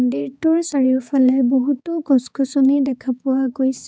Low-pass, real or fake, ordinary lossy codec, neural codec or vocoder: none; fake; none; codec, 16 kHz, 4 kbps, X-Codec, HuBERT features, trained on balanced general audio